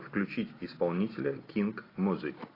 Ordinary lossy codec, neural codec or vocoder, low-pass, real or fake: AAC, 24 kbps; none; 5.4 kHz; real